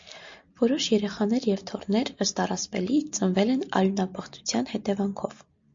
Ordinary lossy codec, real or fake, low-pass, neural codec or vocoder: MP3, 48 kbps; real; 7.2 kHz; none